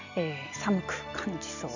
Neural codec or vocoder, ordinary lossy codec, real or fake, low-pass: none; none; real; 7.2 kHz